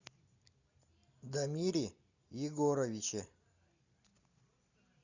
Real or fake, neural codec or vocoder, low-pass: real; none; 7.2 kHz